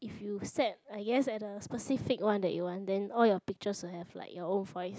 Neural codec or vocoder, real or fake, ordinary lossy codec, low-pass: none; real; none; none